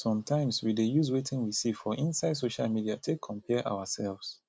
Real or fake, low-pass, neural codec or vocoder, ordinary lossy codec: real; none; none; none